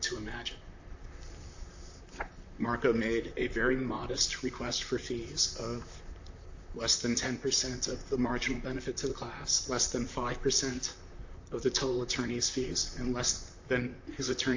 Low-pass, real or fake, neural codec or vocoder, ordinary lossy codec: 7.2 kHz; fake; vocoder, 44.1 kHz, 128 mel bands, Pupu-Vocoder; AAC, 48 kbps